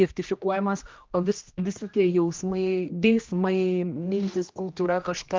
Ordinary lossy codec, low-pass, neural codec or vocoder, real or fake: Opus, 24 kbps; 7.2 kHz; codec, 16 kHz, 1 kbps, X-Codec, HuBERT features, trained on general audio; fake